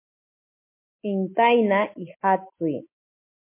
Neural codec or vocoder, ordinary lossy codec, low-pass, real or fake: none; MP3, 24 kbps; 3.6 kHz; real